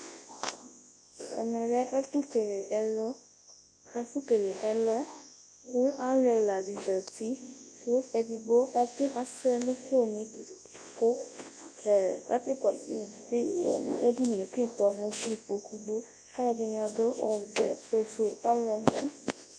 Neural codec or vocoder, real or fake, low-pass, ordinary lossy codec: codec, 24 kHz, 0.9 kbps, WavTokenizer, large speech release; fake; 9.9 kHz; MP3, 48 kbps